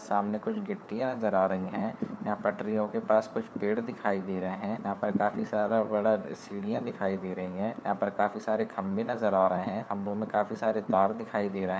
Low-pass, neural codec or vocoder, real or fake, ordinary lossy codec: none; codec, 16 kHz, 4 kbps, FunCodec, trained on LibriTTS, 50 frames a second; fake; none